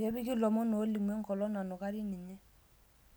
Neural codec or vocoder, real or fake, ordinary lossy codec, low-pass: none; real; none; none